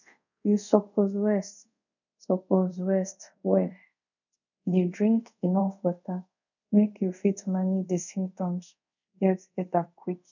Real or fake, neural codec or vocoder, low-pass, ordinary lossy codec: fake; codec, 24 kHz, 0.5 kbps, DualCodec; 7.2 kHz; none